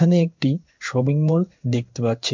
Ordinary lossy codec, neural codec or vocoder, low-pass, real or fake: MP3, 64 kbps; codec, 16 kHz in and 24 kHz out, 1 kbps, XY-Tokenizer; 7.2 kHz; fake